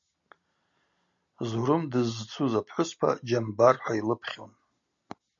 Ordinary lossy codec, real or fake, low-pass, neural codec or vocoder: MP3, 64 kbps; real; 7.2 kHz; none